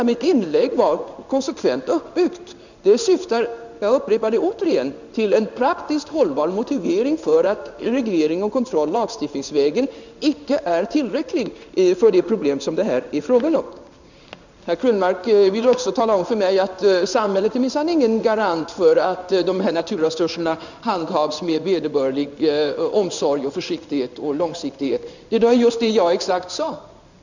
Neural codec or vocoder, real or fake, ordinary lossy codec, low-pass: codec, 16 kHz in and 24 kHz out, 1 kbps, XY-Tokenizer; fake; none; 7.2 kHz